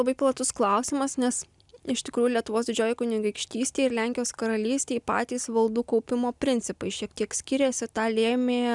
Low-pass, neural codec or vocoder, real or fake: 10.8 kHz; none; real